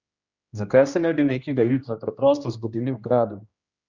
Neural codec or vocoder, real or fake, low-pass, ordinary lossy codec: codec, 16 kHz, 1 kbps, X-Codec, HuBERT features, trained on general audio; fake; 7.2 kHz; Opus, 64 kbps